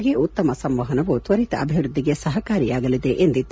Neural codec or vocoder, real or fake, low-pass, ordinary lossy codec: none; real; none; none